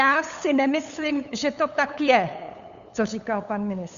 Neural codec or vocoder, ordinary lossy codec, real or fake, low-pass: codec, 16 kHz, 8 kbps, FunCodec, trained on LibriTTS, 25 frames a second; Opus, 64 kbps; fake; 7.2 kHz